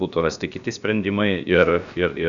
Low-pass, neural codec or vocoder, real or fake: 7.2 kHz; codec, 16 kHz, about 1 kbps, DyCAST, with the encoder's durations; fake